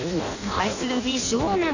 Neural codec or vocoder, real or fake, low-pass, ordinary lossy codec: codec, 16 kHz in and 24 kHz out, 0.6 kbps, FireRedTTS-2 codec; fake; 7.2 kHz; none